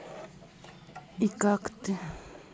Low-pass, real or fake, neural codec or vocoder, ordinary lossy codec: none; real; none; none